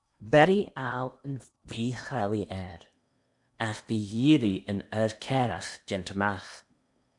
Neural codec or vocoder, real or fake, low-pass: codec, 16 kHz in and 24 kHz out, 0.8 kbps, FocalCodec, streaming, 65536 codes; fake; 10.8 kHz